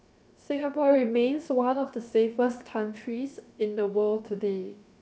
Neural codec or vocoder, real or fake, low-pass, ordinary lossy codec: codec, 16 kHz, 0.7 kbps, FocalCodec; fake; none; none